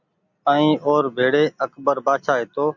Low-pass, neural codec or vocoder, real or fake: 7.2 kHz; none; real